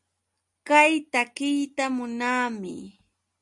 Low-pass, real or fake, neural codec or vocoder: 10.8 kHz; real; none